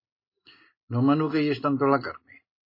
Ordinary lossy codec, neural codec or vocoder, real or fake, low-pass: MP3, 24 kbps; none; real; 5.4 kHz